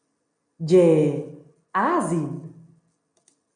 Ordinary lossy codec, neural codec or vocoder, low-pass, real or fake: MP3, 96 kbps; none; 9.9 kHz; real